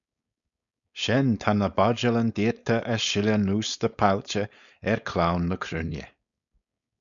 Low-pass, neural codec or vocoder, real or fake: 7.2 kHz; codec, 16 kHz, 4.8 kbps, FACodec; fake